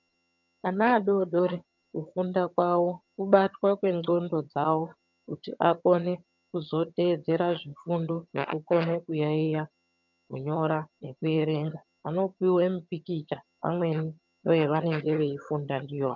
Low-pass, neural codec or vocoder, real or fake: 7.2 kHz; vocoder, 22.05 kHz, 80 mel bands, HiFi-GAN; fake